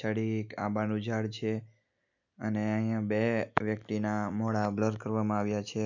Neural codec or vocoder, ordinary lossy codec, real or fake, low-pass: none; none; real; 7.2 kHz